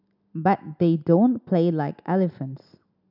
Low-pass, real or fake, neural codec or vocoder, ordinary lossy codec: 5.4 kHz; real; none; none